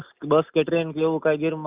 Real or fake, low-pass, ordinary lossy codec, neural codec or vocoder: real; 3.6 kHz; Opus, 64 kbps; none